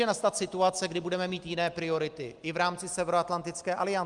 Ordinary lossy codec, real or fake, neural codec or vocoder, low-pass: Opus, 32 kbps; real; none; 10.8 kHz